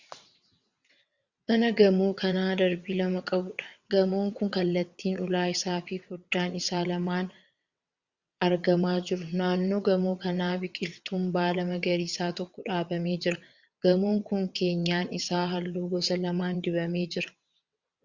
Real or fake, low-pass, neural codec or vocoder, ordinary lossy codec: fake; 7.2 kHz; vocoder, 22.05 kHz, 80 mel bands, WaveNeXt; Opus, 64 kbps